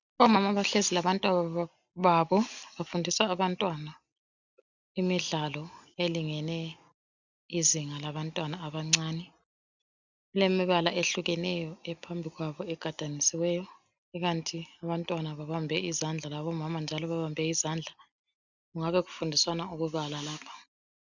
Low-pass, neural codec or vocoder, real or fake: 7.2 kHz; none; real